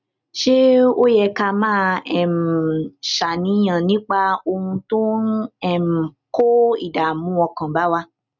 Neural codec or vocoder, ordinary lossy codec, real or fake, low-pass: none; none; real; 7.2 kHz